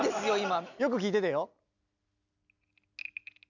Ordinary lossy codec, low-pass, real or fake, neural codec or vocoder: none; 7.2 kHz; real; none